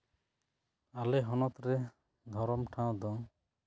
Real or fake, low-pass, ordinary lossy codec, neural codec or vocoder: real; none; none; none